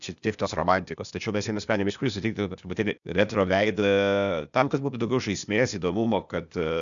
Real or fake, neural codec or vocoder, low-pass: fake; codec, 16 kHz, 0.8 kbps, ZipCodec; 7.2 kHz